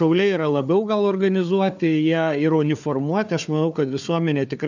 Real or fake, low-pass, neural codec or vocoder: fake; 7.2 kHz; codec, 16 kHz, 4 kbps, FunCodec, trained on Chinese and English, 50 frames a second